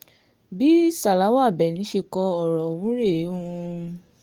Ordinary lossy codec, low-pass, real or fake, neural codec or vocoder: Opus, 24 kbps; 19.8 kHz; fake; codec, 44.1 kHz, 7.8 kbps, DAC